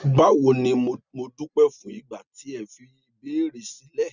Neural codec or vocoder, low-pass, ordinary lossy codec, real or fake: none; 7.2 kHz; none; real